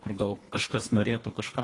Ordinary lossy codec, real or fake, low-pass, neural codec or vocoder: AAC, 32 kbps; fake; 10.8 kHz; codec, 24 kHz, 1.5 kbps, HILCodec